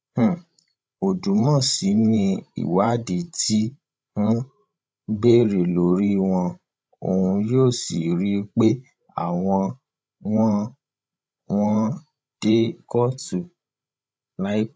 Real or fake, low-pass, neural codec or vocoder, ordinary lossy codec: fake; none; codec, 16 kHz, 16 kbps, FreqCodec, larger model; none